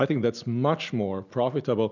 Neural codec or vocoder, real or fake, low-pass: none; real; 7.2 kHz